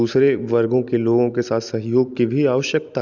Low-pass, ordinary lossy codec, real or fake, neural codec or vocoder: 7.2 kHz; none; real; none